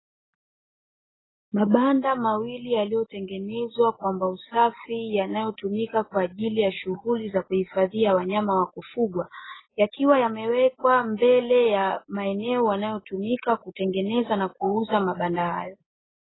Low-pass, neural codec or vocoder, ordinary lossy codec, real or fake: 7.2 kHz; none; AAC, 16 kbps; real